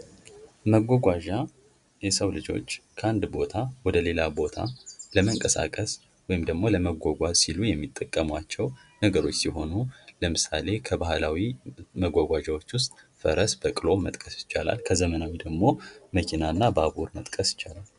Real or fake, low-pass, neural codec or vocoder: real; 10.8 kHz; none